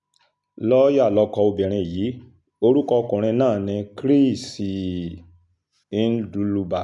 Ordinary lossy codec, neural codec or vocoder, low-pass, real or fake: none; none; 10.8 kHz; real